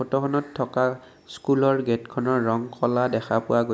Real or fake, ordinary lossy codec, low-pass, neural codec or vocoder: real; none; none; none